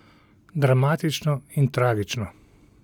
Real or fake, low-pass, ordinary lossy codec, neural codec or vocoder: real; 19.8 kHz; none; none